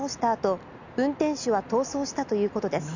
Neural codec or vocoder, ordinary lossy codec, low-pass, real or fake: none; none; 7.2 kHz; real